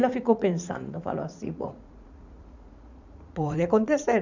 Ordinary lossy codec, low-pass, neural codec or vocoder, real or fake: none; 7.2 kHz; vocoder, 44.1 kHz, 80 mel bands, Vocos; fake